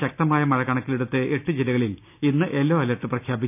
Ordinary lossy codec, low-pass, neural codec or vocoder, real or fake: none; 3.6 kHz; none; real